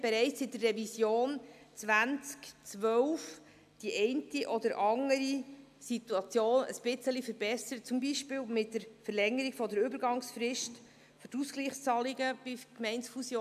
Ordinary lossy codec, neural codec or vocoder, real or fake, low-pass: AAC, 96 kbps; none; real; 14.4 kHz